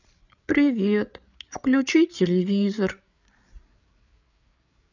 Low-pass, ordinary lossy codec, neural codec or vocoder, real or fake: 7.2 kHz; none; codec, 16 kHz, 8 kbps, FreqCodec, larger model; fake